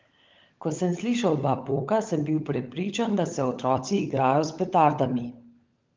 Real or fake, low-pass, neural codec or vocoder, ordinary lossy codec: fake; 7.2 kHz; codec, 16 kHz, 16 kbps, FunCodec, trained on LibriTTS, 50 frames a second; Opus, 32 kbps